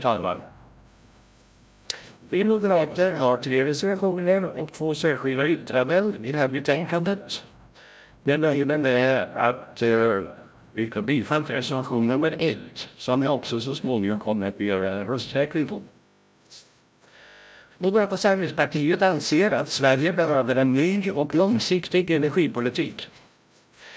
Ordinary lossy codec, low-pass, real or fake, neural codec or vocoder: none; none; fake; codec, 16 kHz, 0.5 kbps, FreqCodec, larger model